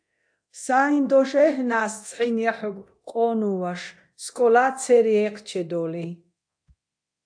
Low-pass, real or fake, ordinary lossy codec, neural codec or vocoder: 9.9 kHz; fake; AAC, 64 kbps; codec, 24 kHz, 0.9 kbps, DualCodec